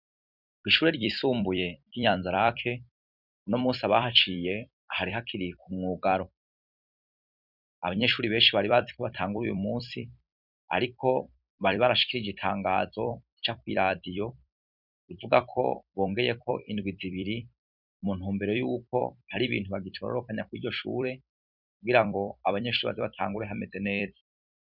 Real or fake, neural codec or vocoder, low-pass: fake; vocoder, 44.1 kHz, 128 mel bands every 256 samples, BigVGAN v2; 5.4 kHz